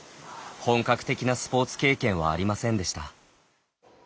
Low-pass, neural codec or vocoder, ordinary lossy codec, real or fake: none; none; none; real